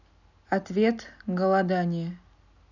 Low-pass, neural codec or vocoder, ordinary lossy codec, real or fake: 7.2 kHz; none; none; real